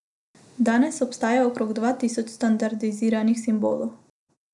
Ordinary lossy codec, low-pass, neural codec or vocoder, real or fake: none; 10.8 kHz; none; real